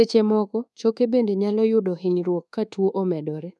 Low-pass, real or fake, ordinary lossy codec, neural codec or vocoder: none; fake; none; codec, 24 kHz, 1.2 kbps, DualCodec